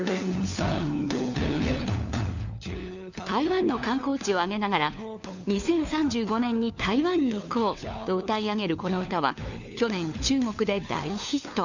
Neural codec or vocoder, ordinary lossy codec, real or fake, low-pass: codec, 16 kHz, 4 kbps, FunCodec, trained on LibriTTS, 50 frames a second; none; fake; 7.2 kHz